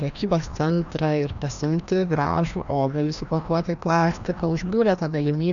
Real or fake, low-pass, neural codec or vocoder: fake; 7.2 kHz; codec, 16 kHz, 1 kbps, FreqCodec, larger model